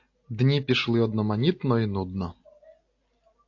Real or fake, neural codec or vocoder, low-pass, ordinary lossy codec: real; none; 7.2 kHz; AAC, 48 kbps